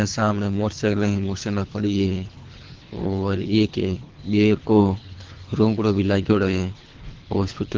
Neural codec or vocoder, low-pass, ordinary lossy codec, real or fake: codec, 24 kHz, 3 kbps, HILCodec; 7.2 kHz; Opus, 16 kbps; fake